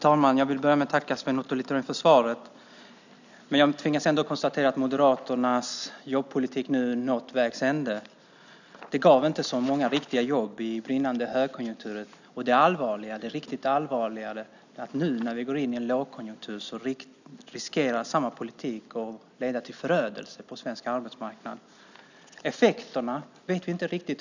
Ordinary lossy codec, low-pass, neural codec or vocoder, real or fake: none; 7.2 kHz; none; real